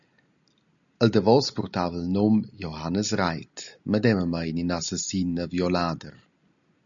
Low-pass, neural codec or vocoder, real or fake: 7.2 kHz; none; real